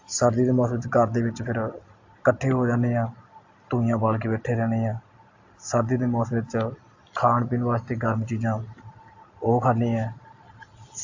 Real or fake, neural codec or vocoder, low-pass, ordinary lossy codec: real; none; 7.2 kHz; AAC, 48 kbps